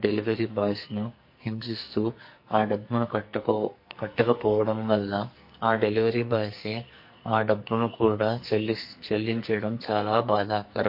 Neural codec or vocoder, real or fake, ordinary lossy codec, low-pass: codec, 44.1 kHz, 2.6 kbps, SNAC; fake; MP3, 32 kbps; 5.4 kHz